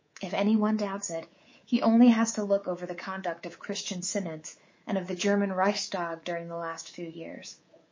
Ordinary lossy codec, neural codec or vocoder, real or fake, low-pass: MP3, 32 kbps; codec, 24 kHz, 3.1 kbps, DualCodec; fake; 7.2 kHz